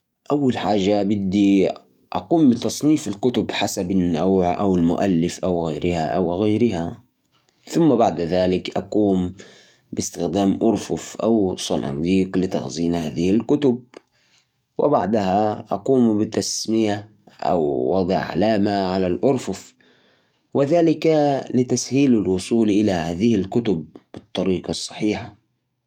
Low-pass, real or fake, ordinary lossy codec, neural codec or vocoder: 19.8 kHz; fake; none; codec, 44.1 kHz, 7.8 kbps, DAC